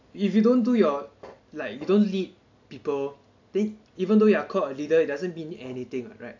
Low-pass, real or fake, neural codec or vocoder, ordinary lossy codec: 7.2 kHz; real; none; AAC, 48 kbps